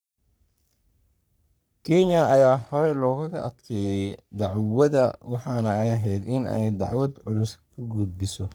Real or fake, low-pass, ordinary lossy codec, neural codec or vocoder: fake; none; none; codec, 44.1 kHz, 3.4 kbps, Pupu-Codec